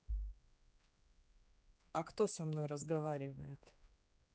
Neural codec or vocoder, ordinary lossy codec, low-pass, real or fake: codec, 16 kHz, 2 kbps, X-Codec, HuBERT features, trained on general audio; none; none; fake